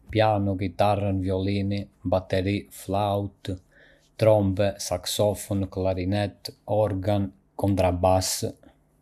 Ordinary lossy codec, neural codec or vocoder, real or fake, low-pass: none; none; real; 14.4 kHz